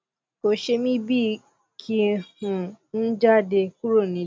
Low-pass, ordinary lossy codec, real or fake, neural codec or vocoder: none; none; real; none